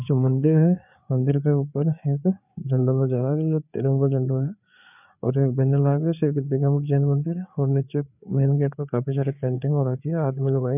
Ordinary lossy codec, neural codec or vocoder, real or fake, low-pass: none; codec, 16 kHz, 4 kbps, FreqCodec, larger model; fake; 3.6 kHz